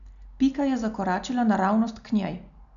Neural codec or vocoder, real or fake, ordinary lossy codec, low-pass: none; real; none; 7.2 kHz